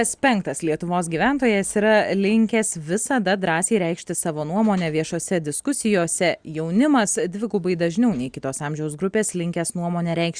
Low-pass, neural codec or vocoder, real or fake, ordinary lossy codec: 9.9 kHz; none; real; Opus, 64 kbps